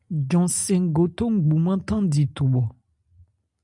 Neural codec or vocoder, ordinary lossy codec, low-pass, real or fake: none; MP3, 96 kbps; 10.8 kHz; real